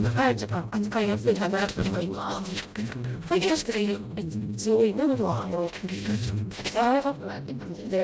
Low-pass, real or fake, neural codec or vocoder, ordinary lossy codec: none; fake; codec, 16 kHz, 0.5 kbps, FreqCodec, smaller model; none